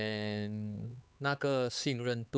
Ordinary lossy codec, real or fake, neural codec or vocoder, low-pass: none; fake; codec, 16 kHz, 4 kbps, X-Codec, HuBERT features, trained on LibriSpeech; none